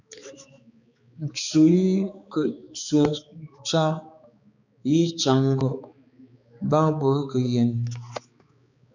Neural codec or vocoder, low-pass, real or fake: codec, 16 kHz, 4 kbps, X-Codec, HuBERT features, trained on balanced general audio; 7.2 kHz; fake